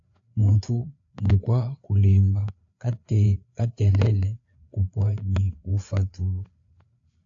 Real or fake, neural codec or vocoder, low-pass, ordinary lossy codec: fake; codec, 16 kHz, 4 kbps, FreqCodec, larger model; 7.2 kHz; AAC, 48 kbps